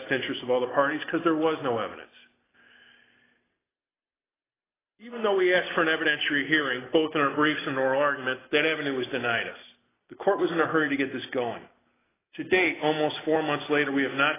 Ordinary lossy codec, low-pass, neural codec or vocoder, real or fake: AAC, 16 kbps; 3.6 kHz; none; real